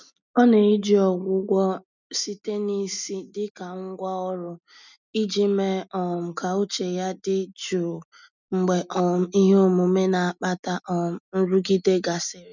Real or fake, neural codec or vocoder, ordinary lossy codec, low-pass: real; none; none; 7.2 kHz